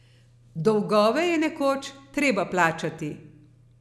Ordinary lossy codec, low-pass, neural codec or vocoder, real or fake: none; none; none; real